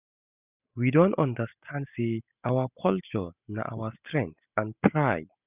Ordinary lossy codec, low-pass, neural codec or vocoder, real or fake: none; 3.6 kHz; none; real